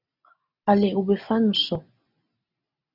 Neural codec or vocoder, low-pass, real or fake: none; 5.4 kHz; real